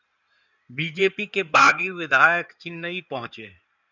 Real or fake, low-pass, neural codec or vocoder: fake; 7.2 kHz; codec, 16 kHz in and 24 kHz out, 2.2 kbps, FireRedTTS-2 codec